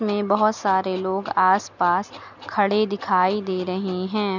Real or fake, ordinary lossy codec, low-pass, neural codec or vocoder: real; none; 7.2 kHz; none